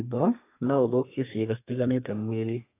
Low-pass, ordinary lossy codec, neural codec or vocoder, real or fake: 3.6 kHz; AAC, 24 kbps; codec, 32 kHz, 1.9 kbps, SNAC; fake